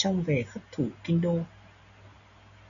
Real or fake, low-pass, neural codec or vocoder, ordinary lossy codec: real; 7.2 kHz; none; MP3, 96 kbps